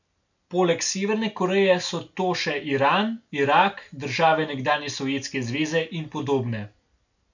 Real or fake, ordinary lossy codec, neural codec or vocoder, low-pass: real; none; none; 7.2 kHz